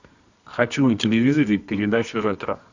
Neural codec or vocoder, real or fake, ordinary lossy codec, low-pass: codec, 24 kHz, 0.9 kbps, WavTokenizer, medium music audio release; fake; Opus, 64 kbps; 7.2 kHz